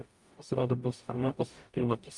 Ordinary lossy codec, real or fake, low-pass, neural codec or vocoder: Opus, 32 kbps; fake; 10.8 kHz; codec, 44.1 kHz, 0.9 kbps, DAC